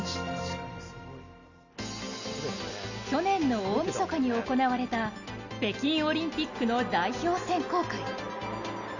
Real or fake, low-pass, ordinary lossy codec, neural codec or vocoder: real; 7.2 kHz; Opus, 64 kbps; none